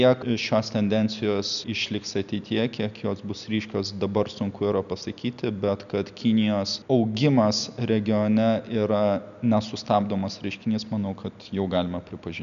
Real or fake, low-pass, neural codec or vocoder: real; 7.2 kHz; none